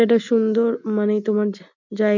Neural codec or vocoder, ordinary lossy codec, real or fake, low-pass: none; none; real; 7.2 kHz